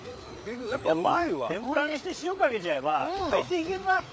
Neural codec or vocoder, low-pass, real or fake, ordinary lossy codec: codec, 16 kHz, 4 kbps, FreqCodec, larger model; none; fake; none